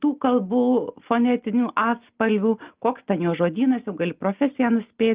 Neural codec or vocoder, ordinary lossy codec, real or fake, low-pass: none; Opus, 32 kbps; real; 3.6 kHz